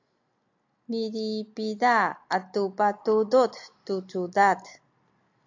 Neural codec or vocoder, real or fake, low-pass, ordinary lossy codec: none; real; 7.2 kHz; AAC, 48 kbps